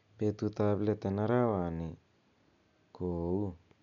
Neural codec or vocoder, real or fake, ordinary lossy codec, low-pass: none; real; none; 7.2 kHz